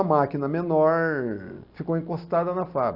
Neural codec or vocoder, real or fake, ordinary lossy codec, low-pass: none; real; Opus, 64 kbps; 5.4 kHz